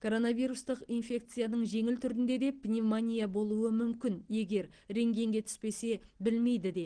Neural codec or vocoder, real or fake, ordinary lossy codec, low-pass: none; real; Opus, 16 kbps; 9.9 kHz